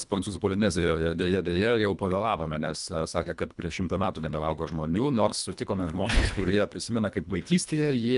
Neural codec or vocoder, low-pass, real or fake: codec, 24 kHz, 1.5 kbps, HILCodec; 10.8 kHz; fake